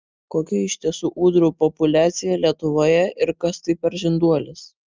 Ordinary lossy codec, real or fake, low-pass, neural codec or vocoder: Opus, 24 kbps; real; 7.2 kHz; none